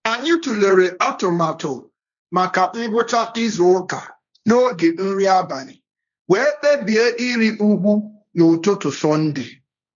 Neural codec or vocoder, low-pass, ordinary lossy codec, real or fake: codec, 16 kHz, 1.1 kbps, Voila-Tokenizer; 7.2 kHz; none; fake